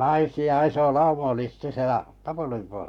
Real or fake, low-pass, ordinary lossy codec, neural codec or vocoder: fake; 19.8 kHz; none; codec, 44.1 kHz, 7.8 kbps, Pupu-Codec